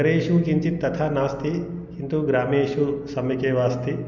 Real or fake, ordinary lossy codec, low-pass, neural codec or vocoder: real; none; 7.2 kHz; none